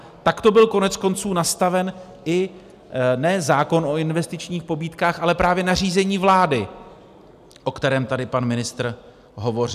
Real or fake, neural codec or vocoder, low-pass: real; none; 14.4 kHz